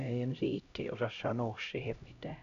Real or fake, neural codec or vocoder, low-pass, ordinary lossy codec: fake; codec, 16 kHz, 0.5 kbps, X-Codec, HuBERT features, trained on LibriSpeech; 7.2 kHz; none